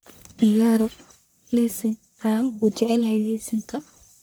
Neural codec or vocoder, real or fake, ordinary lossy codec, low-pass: codec, 44.1 kHz, 1.7 kbps, Pupu-Codec; fake; none; none